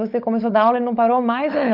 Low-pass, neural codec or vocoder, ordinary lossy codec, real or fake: 5.4 kHz; codec, 16 kHz, 16 kbps, FunCodec, trained on LibriTTS, 50 frames a second; none; fake